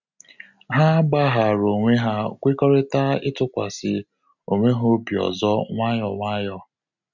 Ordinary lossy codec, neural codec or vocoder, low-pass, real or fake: none; none; 7.2 kHz; real